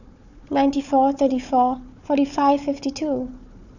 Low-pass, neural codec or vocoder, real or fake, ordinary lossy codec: 7.2 kHz; codec, 16 kHz, 4 kbps, FunCodec, trained on Chinese and English, 50 frames a second; fake; none